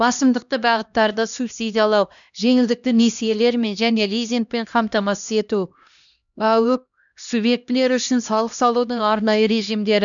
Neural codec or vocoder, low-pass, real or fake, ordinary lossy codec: codec, 16 kHz, 1 kbps, X-Codec, HuBERT features, trained on LibriSpeech; 7.2 kHz; fake; none